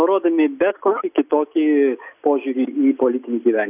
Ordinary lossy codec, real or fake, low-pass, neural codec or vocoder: AAC, 32 kbps; real; 3.6 kHz; none